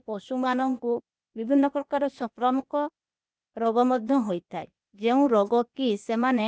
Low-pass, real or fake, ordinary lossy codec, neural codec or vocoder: none; fake; none; codec, 16 kHz, 0.8 kbps, ZipCodec